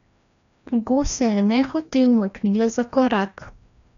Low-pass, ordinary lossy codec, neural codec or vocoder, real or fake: 7.2 kHz; none; codec, 16 kHz, 1 kbps, FreqCodec, larger model; fake